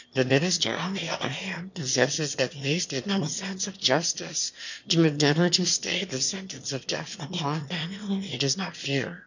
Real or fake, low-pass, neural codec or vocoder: fake; 7.2 kHz; autoencoder, 22.05 kHz, a latent of 192 numbers a frame, VITS, trained on one speaker